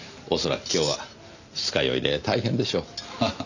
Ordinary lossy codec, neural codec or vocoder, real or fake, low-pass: none; none; real; 7.2 kHz